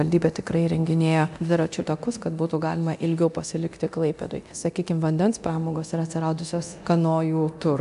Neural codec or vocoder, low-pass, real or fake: codec, 24 kHz, 0.9 kbps, DualCodec; 10.8 kHz; fake